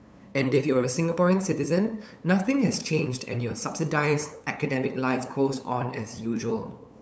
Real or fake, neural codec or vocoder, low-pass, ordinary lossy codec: fake; codec, 16 kHz, 8 kbps, FunCodec, trained on LibriTTS, 25 frames a second; none; none